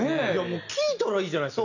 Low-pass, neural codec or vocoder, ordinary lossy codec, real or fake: 7.2 kHz; none; none; real